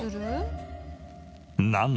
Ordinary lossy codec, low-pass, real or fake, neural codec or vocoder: none; none; real; none